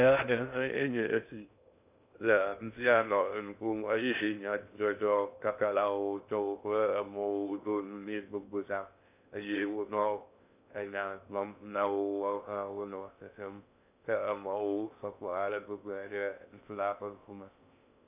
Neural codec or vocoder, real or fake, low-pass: codec, 16 kHz in and 24 kHz out, 0.6 kbps, FocalCodec, streaming, 2048 codes; fake; 3.6 kHz